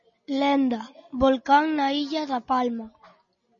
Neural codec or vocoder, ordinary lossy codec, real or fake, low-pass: none; MP3, 32 kbps; real; 7.2 kHz